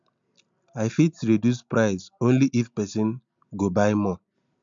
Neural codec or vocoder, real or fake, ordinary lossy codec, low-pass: none; real; MP3, 64 kbps; 7.2 kHz